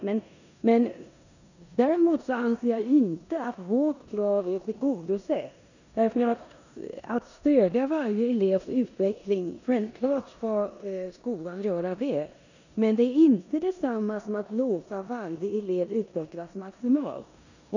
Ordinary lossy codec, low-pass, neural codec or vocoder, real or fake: none; 7.2 kHz; codec, 16 kHz in and 24 kHz out, 0.9 kbps, LongCat-Audio-Codec, four codebook decoder; fake